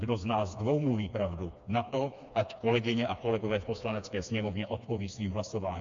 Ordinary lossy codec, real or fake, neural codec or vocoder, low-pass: MP3, 48 kbps; fake; codec, 16 kHz, 2 kbps, FreqCodec, smaller model; 7.2 kHz